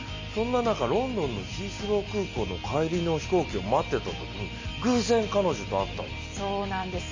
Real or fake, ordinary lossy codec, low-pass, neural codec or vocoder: real; MP3, 32 kbps; 7.2 kHz; none